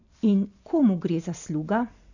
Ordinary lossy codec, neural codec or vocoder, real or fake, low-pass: none; none; real; 7.2 kHz